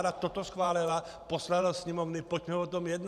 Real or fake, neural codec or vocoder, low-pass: fake; vocoder, 44.1 kHz, 128 mel bands every 512 samples, BigVGAN v2; 14.4 kHz